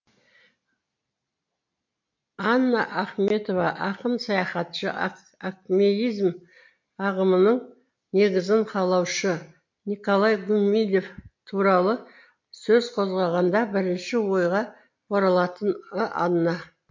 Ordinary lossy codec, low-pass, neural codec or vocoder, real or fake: MP3, 48 kbps; 7.2 kHz; none; real